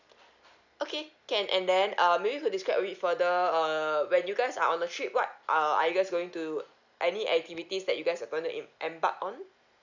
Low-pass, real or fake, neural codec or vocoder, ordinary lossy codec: 7.2 kHz; real; none; none